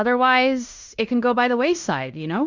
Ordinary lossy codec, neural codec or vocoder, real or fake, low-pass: Opus, 64 kbps; codec, 16 kHz in and 24 kHz out, 0.9 kbps, LongCat-Audio-Codec, fine tuned four codebook decoder; fake; 7.2 kHz